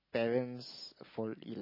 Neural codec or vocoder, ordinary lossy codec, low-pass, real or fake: none; MP3, 24 kbps; 5.4 kHz; real